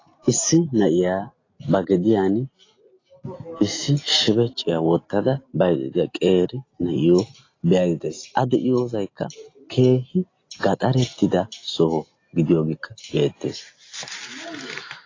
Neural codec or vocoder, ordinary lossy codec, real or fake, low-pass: none; AAC, 32 kbps; real; 7.2 kHz